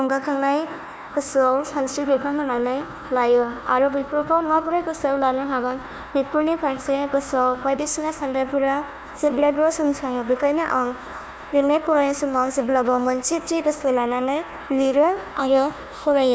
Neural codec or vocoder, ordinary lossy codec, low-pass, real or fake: codec, 16 kHz, 1 kbps, FunCodec, trained on Chinese and English, 50 frames a second; none; none; fake